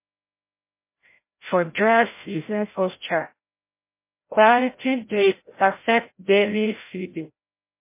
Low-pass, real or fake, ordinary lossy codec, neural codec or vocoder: 3.6 kHz; fake; MP3, 24 kbps; codec, 16 kHz, 0.5 kbps, FreqCodec, larger model